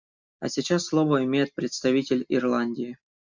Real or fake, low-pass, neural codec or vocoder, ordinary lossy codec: real; 7.2 kHz; none; MP3, 64 kbps